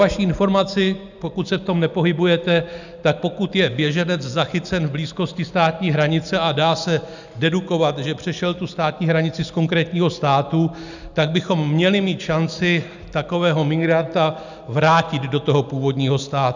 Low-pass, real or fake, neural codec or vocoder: 7.2 kHz; real; none